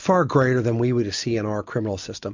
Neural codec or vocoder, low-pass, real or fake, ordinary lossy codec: none; 7.2 kHz; real; MP3, 48 kbps